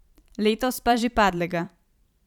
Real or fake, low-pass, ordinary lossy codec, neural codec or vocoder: fake; 19.8 kHz; none; vocoder, 44.1 kHz, 128 mel bands every 512 samples, BigVGAN v2